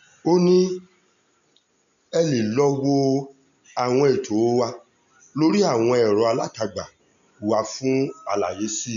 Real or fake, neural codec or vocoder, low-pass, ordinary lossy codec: real; none; 7.2 kHz; MP3, 96 kbps